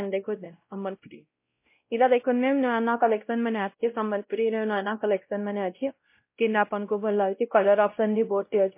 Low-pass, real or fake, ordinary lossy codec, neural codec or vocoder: 3.6 kHz; fake; MP3, 24 kbps; codec, 16 kHz, 0.5 kbps, X-Codec, WavLM features, trained on Multilingual LibriSpeech